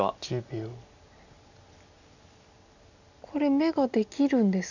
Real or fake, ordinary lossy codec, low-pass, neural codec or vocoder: real; none; 7.2 kHz; none